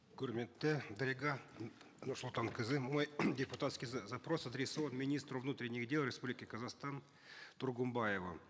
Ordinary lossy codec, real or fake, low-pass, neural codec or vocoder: none; real; none; none